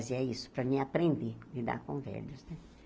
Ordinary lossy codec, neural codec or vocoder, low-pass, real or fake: none; none; none; real